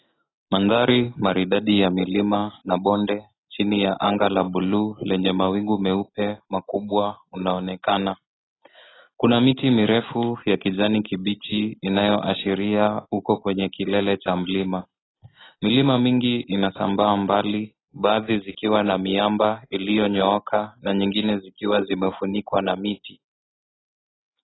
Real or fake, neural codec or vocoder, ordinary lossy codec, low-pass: real; none; AAC, 16 kbps; 7.2 kHz